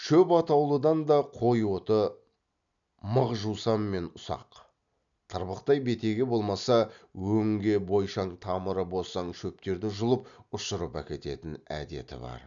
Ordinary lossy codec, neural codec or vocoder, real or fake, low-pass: none; none; real; 7.2 kHz